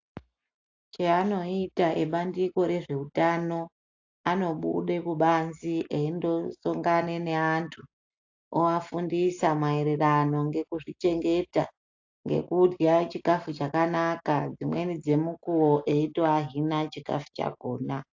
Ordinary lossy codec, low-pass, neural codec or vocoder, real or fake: AAC, 48 kbps; 7.2 kHz; none; real